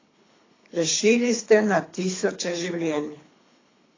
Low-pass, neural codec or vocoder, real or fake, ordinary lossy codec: 7.2 kHz; codec, 24 kHz, 3 kbps, HILCodec; fake; AAC, 32 kbps